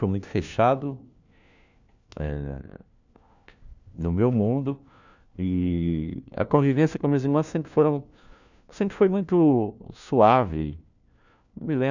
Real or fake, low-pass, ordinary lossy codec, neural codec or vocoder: fake; 7.2 kHz; none; codec, 16 kHz, 1 kbps, FunCodec, trained on LibriTTS, 50 frames a second